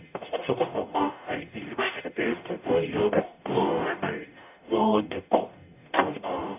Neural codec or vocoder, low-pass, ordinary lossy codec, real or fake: codec, 44.1 kHz, 0.9 kbps, DAC; 3.6 kHz; none; fake